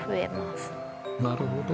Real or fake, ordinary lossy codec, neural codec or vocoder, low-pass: real; none; none; none